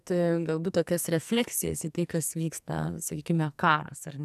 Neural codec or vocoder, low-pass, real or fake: codec, 44.1 kHz, 2.6 kbps, SNAC; 14.4 kHz; fake